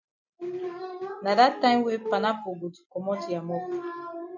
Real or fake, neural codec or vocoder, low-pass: real; none; 7.2 kHz